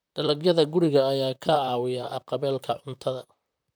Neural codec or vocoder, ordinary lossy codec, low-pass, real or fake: vocoder, 44.1 kHz, 128 mel bands, Pupu-Vocoder; none; none; fake